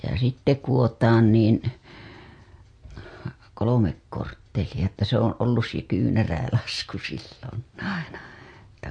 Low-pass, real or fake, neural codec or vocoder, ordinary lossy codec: 9.9 kHz; real; none; MP3, 48 kbps